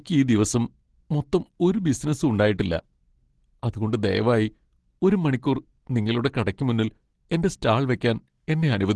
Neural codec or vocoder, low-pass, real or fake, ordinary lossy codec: none; 10.8 kHz; real; Opus, 16 kbps